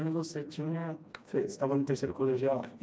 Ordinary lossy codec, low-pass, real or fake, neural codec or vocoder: none; none; fake; codec, 16 kHz, 1 kbps, FreqCodec, smaller model